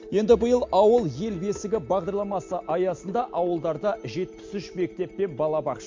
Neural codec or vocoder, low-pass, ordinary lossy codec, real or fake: none; 7.2 kHz; MP3, 48 kbps; real